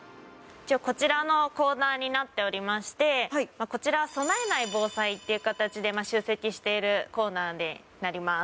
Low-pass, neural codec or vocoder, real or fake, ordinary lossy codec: none; none; real; none